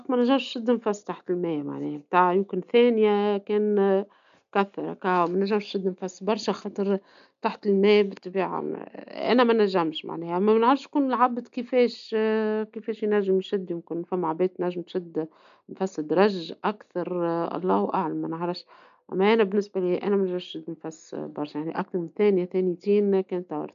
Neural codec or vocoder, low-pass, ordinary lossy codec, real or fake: none; 7.2 kHz; none; real